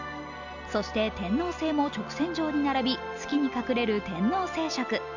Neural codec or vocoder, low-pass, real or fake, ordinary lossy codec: none; 7.2 kHz; real; none